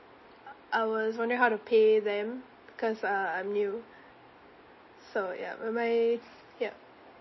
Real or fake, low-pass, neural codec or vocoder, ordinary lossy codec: real; 7.2 kHz; none; MP3, 24 kbps